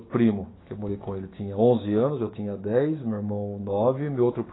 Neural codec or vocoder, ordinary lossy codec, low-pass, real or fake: codec, 16 kHz, 6 kbps, DAC; AAC, 16 kbps; 7.2 kHz; fake